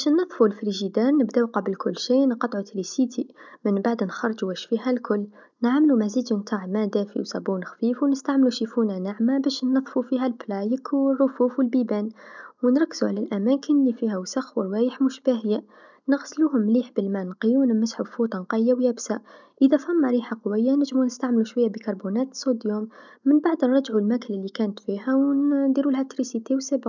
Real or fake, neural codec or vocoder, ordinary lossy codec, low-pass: real; none; none; 7.2 kHz